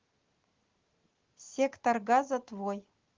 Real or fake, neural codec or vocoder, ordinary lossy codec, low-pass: real; none; Opus, 16 kbps; 7.2 kHz